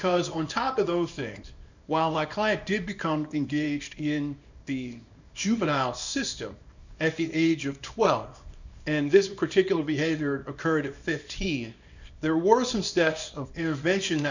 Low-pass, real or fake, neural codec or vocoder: 7.2 kHz; fake; codec, 24 kHz, 0.9 kbps, WavTokenizer, small release